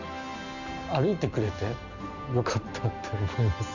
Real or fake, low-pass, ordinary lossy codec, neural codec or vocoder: real; 7.2 kHz; none; none